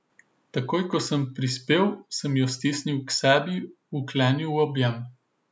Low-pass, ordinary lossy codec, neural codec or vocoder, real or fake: none; none; none; real